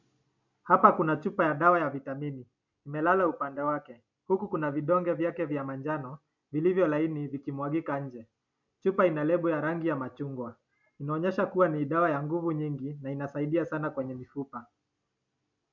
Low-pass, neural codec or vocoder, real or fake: 7.2 kHz; none; real